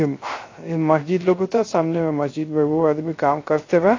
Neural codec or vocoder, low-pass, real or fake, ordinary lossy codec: codec, 16 kHz, 0.3 kbps, FocalCodec; 7.2 kHz; fake; AAC, 32 kbps